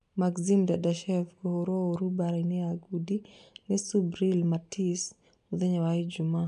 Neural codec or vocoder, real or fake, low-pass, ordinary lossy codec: none; real; 10.8 kHz; none